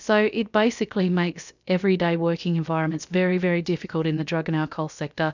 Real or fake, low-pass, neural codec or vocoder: fake; 7.2 kHz; codec, 16 kHz, about 1 kbps, DyCAST, with the encoder's durations